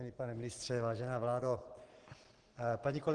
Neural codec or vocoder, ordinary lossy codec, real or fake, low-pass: none; Opus, 16 kbps; real; 9.9 kHz